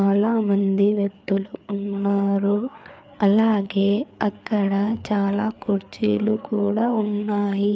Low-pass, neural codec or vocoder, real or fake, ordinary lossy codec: none; codec, 16 kHz, 4 kbps, FreqCodec, larger model; fake; none